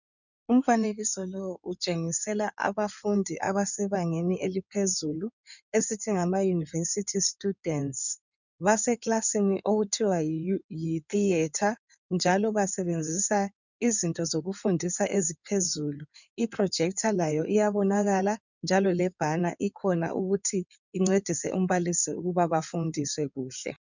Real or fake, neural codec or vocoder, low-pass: fake; codec, 16 kHz in and 24 kHz out, 2.2 kbps, FireRedTTS-2 codec; 7.2 kHz